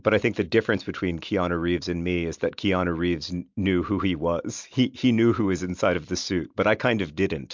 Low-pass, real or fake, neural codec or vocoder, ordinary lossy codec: 7.2 kHz; real; none; MP3, 64 kbps